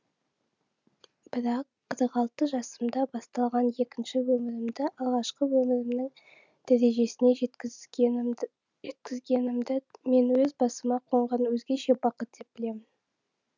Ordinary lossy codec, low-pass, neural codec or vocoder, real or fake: none; 7.2 kHz; none; real